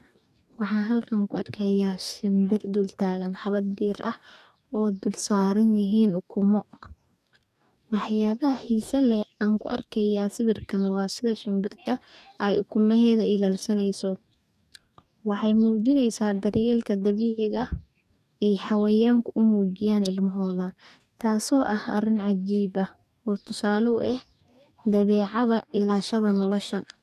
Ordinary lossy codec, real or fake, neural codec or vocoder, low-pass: none; fake; codec, 44.1 kHz, 2.6 kbps, DAC; 14.4 kHz